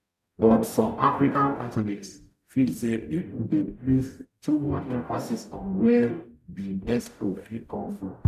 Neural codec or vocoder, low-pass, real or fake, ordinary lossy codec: codec, 44.1 kHz, 0.9 kbps, DAC; 14.4 kHz; fake; none